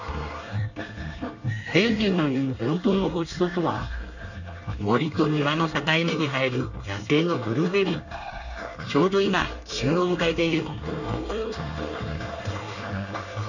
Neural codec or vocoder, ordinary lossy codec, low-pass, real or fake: codec, 24 kHz, 1 kbps, SNAC; none; 7.2 kHz; fake